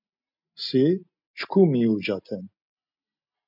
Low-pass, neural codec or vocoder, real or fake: 5.4 kHz; none; real